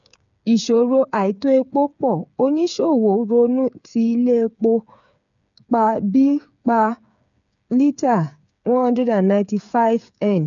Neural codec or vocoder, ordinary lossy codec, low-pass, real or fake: codec, 16 kHz, 8 kbps, FreqCodec, smaller model; none; 7.2 kHz; fake